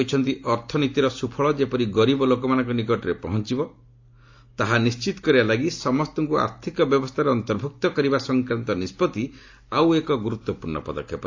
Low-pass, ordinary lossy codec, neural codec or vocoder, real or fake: 7.2 kHz; AAC, 48 kbps; none; real